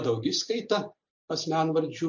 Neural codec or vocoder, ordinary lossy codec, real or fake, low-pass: none; MP3, 48 kbps; real; 7.2 kHz